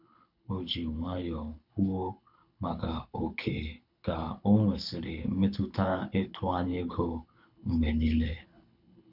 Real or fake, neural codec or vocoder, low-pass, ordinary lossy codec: fake; codec, 24 kHz, 6 kbps, HILCodec; 5.4 kHz; none